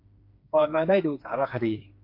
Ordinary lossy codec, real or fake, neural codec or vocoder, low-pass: AAC, 32 kbps; fake; codec, 16 kHz, 4 kbps, FreqCodec, smaller model; 5.4 kHz